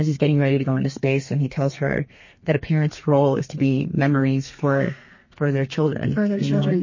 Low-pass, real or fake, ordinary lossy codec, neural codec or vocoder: 7.2 kHz; fake; MP3, 32 kbps; codec, 32 kHz, 1.9 kbps, SNAC